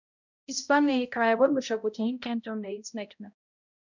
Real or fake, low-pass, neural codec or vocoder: fake; 7.2 kHz; codec, 16 kHz, 0.5 kbps, X-Codec, HuBERT features, trained on balanced general audio